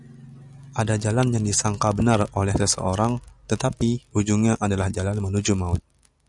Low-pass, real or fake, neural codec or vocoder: 10.8 kHz; real; none